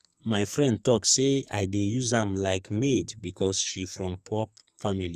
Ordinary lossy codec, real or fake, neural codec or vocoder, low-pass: Opus, 64 kbps; fake; codec, 44.1 kHz, 2.6 kbps, SNAC; 14.4 kHz